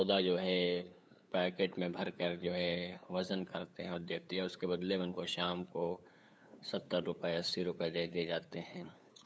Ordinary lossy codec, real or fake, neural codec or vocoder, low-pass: none; fake; codec, 16 kHz, 8 kbps, FunCodec, trained on LibriTTS, 25 frames a second; none